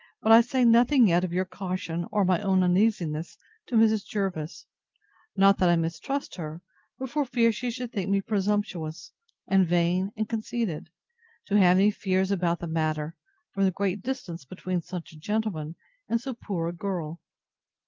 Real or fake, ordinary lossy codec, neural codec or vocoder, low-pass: real; Opus, 32 kbps; none; 7.2 kHz